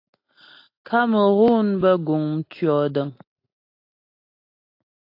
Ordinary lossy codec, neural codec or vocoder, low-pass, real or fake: AAC, 32 kbps; none; 5.4 kHz; real